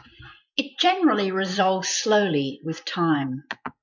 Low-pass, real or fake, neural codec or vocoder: 7.2 kHz; fake; vocoder, 24 kHz, 100 mel bands, Vocos